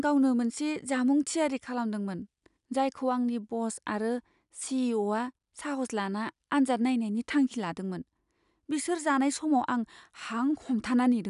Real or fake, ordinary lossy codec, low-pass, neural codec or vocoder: real; none; 10.8 kHz; none